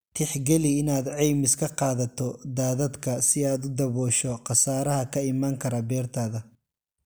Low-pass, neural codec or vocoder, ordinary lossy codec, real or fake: none; none; none; real